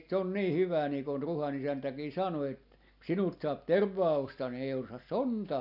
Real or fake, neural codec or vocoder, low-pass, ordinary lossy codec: real; none; 5.4 kHz; none